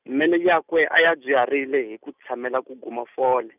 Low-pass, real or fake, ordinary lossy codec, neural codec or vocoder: 3.6 kHz; real; none; none